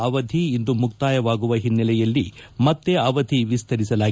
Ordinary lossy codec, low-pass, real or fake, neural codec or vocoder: none; none; real; none